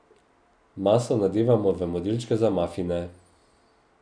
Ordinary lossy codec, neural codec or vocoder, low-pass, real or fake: none; none; 9.9 kHz; real